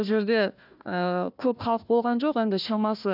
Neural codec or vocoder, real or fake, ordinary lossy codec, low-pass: codec, 16 kHz, 1 kbps, FunCodec, trained on Chinese and English, 50 frames a second; fake; none; 5.4 kHz